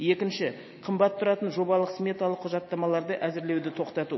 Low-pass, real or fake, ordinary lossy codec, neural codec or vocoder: 7.2 kHz; real; MP3, 24 kbps; none